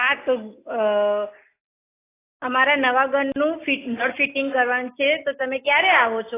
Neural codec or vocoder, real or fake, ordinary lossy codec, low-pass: none; real; AAC, 16 kbps; 3.6 kHz